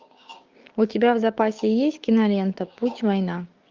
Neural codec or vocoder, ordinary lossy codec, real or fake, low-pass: codec, 16 kHz, 6 kbps, DAC; Opus, 24 kbps; fake; 7.2 kHz